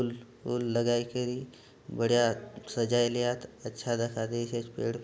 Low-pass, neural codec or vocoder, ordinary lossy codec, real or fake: none; none; none; real